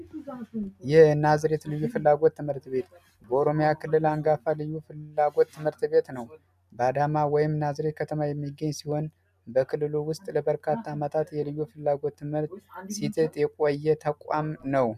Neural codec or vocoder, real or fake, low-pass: none; real; 14.4 kHz